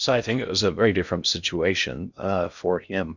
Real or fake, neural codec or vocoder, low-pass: fake; codec, 16 kHz in and 24 kHz out, 0.6 kbps, FocalCodec, streaming, 2048 codes; 7.2 kHz